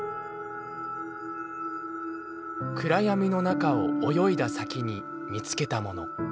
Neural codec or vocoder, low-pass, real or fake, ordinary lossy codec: none; none; real; none